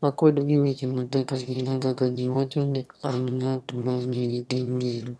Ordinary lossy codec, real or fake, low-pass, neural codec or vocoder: none; fake; none; autoencoder, 22.05 kHz, a latent of 192 numbers a frame, VITS, trained on one speaker